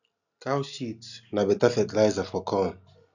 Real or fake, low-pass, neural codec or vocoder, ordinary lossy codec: real; 7.2 kHz; none; none